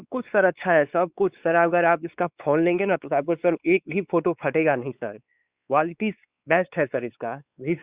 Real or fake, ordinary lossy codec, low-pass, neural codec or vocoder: fake; Opus, 64 kbps; 3.6 kHz; codec, 16 kHz, 4 kbps, X-Codec, WavLM features, trained on Multilingual LibriSpeech